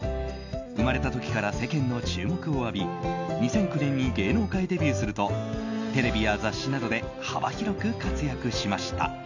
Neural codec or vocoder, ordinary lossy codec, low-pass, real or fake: none; none; 7.2 kHz; real